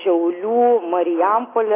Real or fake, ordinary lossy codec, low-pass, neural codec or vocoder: real; AAC, 16 kbps; 3.6 kHz; none